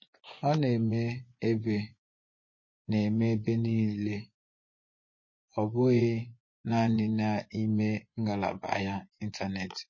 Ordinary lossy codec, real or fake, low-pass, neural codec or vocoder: MP3, 32 kbps; fake; 7.2 kHz; vocoder, 24 kHz, 100 mel bands, Vocos